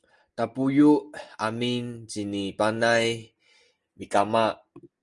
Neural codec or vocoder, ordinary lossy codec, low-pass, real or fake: none; Opus, 32 kbps; 10.8 kHz; real